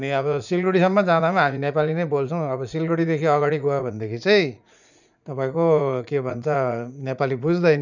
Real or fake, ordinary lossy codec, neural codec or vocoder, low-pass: fake; none; vocoder, 44.1 kHz, 80 mel bands, Vocos; 7.2 kHz